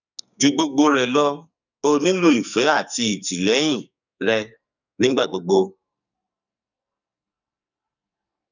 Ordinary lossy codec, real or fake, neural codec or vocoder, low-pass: none; fake; codec, 44.1 kHz, 2.6 kbps, SNAC; 7.2 kHz